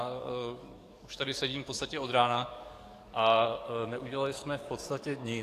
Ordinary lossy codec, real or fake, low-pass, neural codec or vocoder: AAC, 64 kbps; fake; 14.4 kHz; codec, 44.1 kHz, 7.8 kbps, DAC